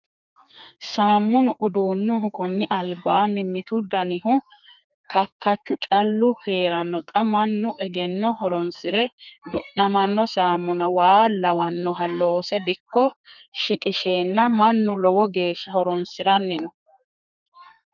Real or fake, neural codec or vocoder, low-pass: fake; codec, 32 kHz, 1.9 kbps, SNAC; 7.2 kHz